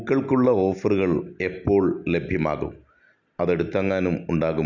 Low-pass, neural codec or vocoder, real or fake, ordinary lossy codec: 7.2 kHz; none; real; none